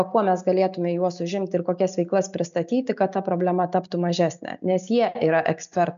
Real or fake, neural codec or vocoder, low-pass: real; none; 7.2 kHz